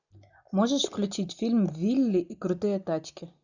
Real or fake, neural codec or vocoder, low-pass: real; none; 7.2 kHz